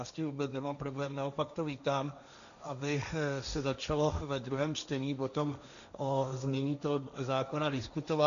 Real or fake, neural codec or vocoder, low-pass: fake; codec, 16 kHz, 1.1 kbps, Voila-Tokenizer; 7.2 kHz